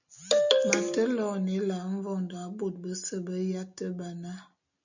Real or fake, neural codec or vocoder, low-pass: real; none; 7.2 kHz